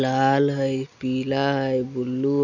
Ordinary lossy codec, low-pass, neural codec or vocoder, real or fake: none; 7.2 kHz; none; real